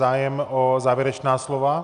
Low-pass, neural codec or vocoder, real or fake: 9.9 kHz; none; real